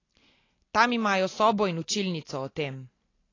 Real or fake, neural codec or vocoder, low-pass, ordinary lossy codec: real; none; 7.2 kHz; AAC, 32 kbps